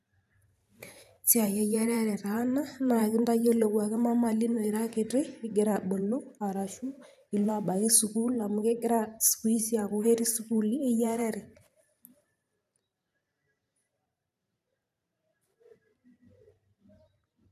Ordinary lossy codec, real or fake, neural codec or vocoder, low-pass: none; fake; vocoder, 44.1 kHz, 128 mel bands every 512 samples, BigVGAN v2; 14.4 kHz